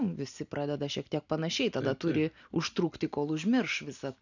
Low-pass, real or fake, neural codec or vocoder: 7.2 kHz; real; none